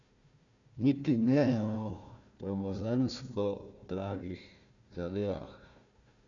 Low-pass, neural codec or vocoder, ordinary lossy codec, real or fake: 7.2 kHz; codec, 16 kHz, 1 kbps, FunCodec, trained on Chinese and English, 50 frames a second; MP3, 96 kbps; fake